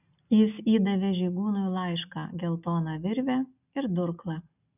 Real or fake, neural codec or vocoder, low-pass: real; none; 3.6 kHz